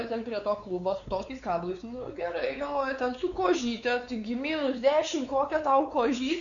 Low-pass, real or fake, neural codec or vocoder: 7.2 kHz; fake; codec, 16 kHz, 4 kbps, X-Codec, WavLM features, trained on Multilingual LibriSpeech